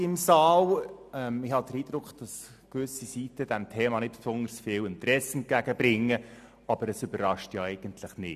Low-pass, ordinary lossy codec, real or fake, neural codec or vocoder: 14.4 kHz; MP3, 96 kbps; real; none